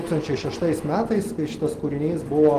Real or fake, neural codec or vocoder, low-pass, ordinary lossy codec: real; none; 14.4 kHz; Opus, 16 kbps